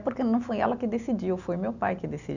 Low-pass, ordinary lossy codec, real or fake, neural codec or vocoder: 7.2 kHz; none; real; none